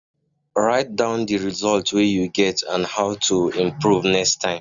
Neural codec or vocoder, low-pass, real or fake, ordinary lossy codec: none; 7.2 kHz; real; none